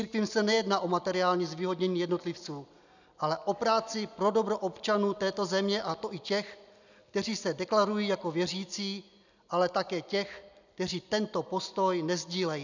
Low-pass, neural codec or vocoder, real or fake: 7.2 kHz; none; real